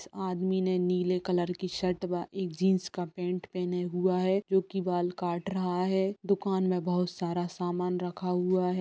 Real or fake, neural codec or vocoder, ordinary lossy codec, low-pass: real; none; none; none